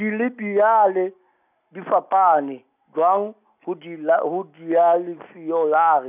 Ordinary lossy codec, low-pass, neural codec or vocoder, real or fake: none; 3.6 kHz; none; real